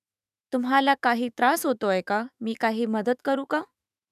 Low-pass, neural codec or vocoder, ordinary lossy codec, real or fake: 14.4 kHz; autoencoder, 48 kHz, 128 numbers a frame, DAC-VAE, trained on Japanese speech; none; fake